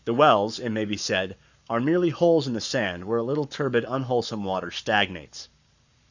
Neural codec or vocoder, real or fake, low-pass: codec, 44.1 kHz, 7.8 kbps, Pupu-Codec; fake; 7.2 kHz